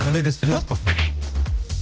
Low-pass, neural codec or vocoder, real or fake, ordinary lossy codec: none; codec, 16 kHz, 0.5 kbps, X-Codec, HuBERT features, trained on general audio; fake; none